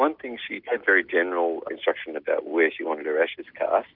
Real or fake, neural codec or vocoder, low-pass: real; none; 5.4 kHz